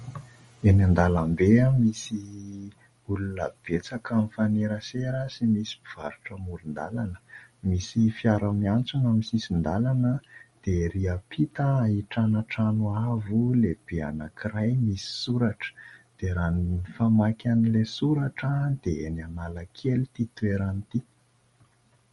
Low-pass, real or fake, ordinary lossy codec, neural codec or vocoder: 19.8 kHz; real; MP3, 48 kbps; none